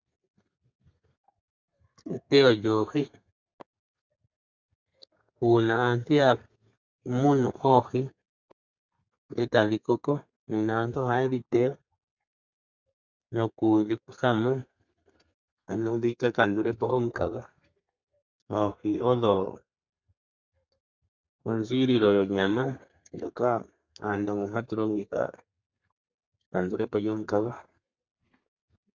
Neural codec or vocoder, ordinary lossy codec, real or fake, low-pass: codec, 32 kHz, 1.9 kbps, SNAC; Opus, 64 kbps; fake; 7.2 kHz